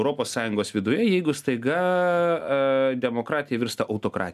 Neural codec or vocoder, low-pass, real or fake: none; 14.4 kHz; real